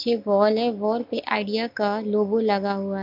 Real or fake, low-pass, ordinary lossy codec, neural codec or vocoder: real; 5.4 kHz; MP3, 48 kbps; none